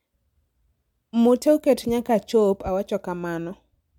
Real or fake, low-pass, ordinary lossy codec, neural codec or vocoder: real; 19.8 kHz; MP3, 96 kbps; none